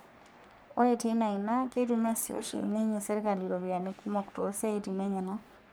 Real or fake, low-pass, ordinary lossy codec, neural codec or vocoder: fake; none; none; codec, 44.1 kHz, 3.4 kbps, Pupu-Codec